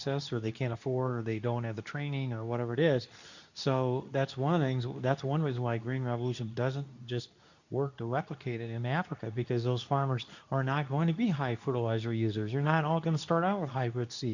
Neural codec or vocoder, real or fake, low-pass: codec, 24 kHz, 0.9 kbps, WavTokenizer, medium speech release version 2; fake; 7.2 kHz